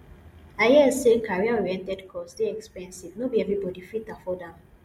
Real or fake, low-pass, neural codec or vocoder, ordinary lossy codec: real; 19.8 kHz; none; MP3, 64 kbps